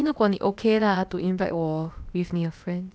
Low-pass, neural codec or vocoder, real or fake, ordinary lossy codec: none; codec, 16 kHz, about 1 kbps, DyCAST, with the encoder's durations; fake; none